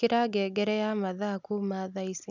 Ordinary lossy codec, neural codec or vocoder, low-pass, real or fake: none; none; 7.2 kHz; real